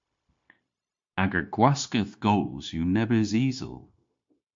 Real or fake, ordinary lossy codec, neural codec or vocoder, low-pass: fake; MP3, 48 kbps; codec, 16 kHz, 0.9 kbps, LongCat-Audio-Codec; 7.2 kHz